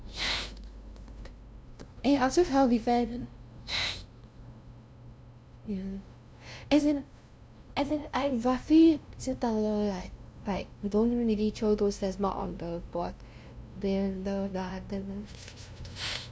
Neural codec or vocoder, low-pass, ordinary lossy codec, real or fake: codec, 16 kHz, 0.5 kbps, FunCodec, trained on LibriTTS, 25 frames a second; none; none; fake